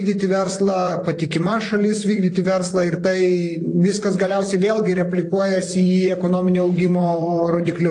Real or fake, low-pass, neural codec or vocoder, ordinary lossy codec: fake; 10.8 kHz; vocoder, 44.1 kHz, 128 mel bands, Pupu-Vocoder; AAC, 48 kbps